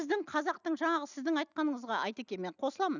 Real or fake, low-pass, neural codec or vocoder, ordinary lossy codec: real; 7.2 kHz; none; none